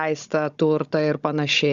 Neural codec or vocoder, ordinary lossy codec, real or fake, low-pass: codec, 16 kHz, 16 kbps, FunCodec, trained on Chinese and English, 50 frames a second; Opus, 64 kbps; fake; 7.2 kHz